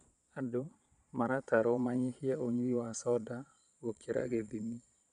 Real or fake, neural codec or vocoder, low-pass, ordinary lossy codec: fake; vocoder, 22.05 kHz, 80 mel bands, Vocos; 9.9 kHz; none